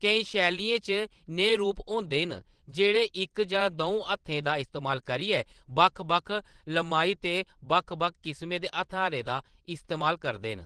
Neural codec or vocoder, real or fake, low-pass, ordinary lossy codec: vocoder, 22.05 kHz, 80 mel bands, Vocos; fake; 9.9 kHz; Opus, 16 kbps